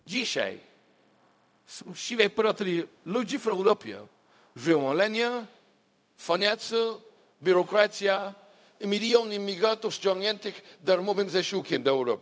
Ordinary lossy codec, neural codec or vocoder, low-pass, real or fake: none; codec, 16 kHz, 0.4 kbps, LongCat-Audio-Codec; none; fake